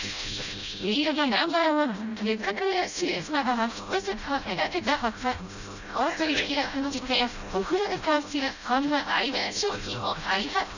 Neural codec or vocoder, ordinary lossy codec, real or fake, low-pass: codec, 16 kHz, 0.5 kbps, FreqCodec, smaller model; none; fake; 7.2 kHz